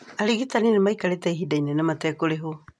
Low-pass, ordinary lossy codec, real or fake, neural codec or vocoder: none; none; real; none